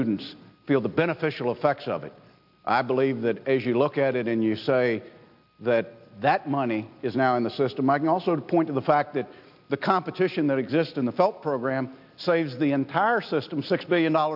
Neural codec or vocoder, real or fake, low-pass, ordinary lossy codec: none; real; 5.4 kHz; AAC, 48 kbps